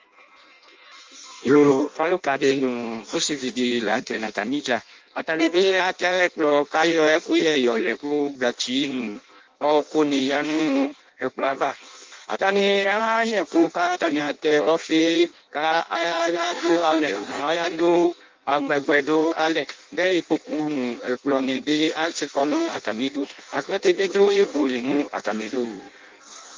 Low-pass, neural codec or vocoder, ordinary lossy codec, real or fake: 7.2 kHz; codec, 16 kHz in and 24 kHz out, 0.6 kbps, FireRedTTS-2 codec; Opus, 32 kbps; fake